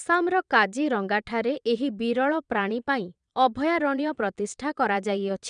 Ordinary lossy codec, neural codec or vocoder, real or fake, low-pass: none; vocoder, 22.05 kHz, 80 mel bands, Vocos; fake; 9.9 kHz